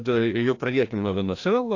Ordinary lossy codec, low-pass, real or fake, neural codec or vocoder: AAC, 48 kbps; 7.2 kHz; fake; codec, 16 kHz, 1 kbps, FreqCodec, larger model